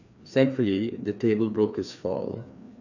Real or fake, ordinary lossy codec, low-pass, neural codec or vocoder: fake; none; 7.2 kHz; codec, 16 kHz, 2 kbps, FreqCodec, larger model